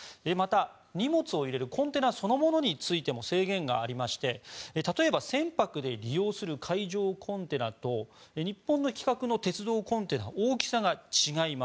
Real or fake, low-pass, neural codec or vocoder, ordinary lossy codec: real; none; none; none